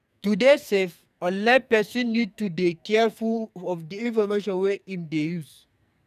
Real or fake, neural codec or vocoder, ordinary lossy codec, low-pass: fake; codec, 44.1 kHz, 2.6 kbps, SNAC; none; 14.4 kHz